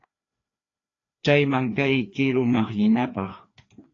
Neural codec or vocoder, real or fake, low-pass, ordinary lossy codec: codec, 16 kHz, 2 kbps, FreqCodec, larger model; fake; 7.2 kHz; AAC, 32 kbps